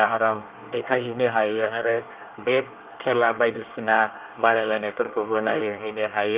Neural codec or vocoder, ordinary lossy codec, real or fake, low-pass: codec, 24 kHz, 1 kbps, SNAC; Opus, 32 kbps; fake; 3.6 kHz